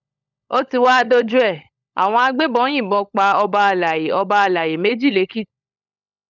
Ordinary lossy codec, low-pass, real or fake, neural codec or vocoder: none; 7.2 kHz; fake; codec, 16 kHz, 16 kbps, FunCodec, trained on LibriTTS, 50 frames a second